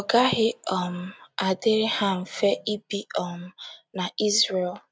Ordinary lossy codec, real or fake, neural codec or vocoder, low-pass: none; real; none; none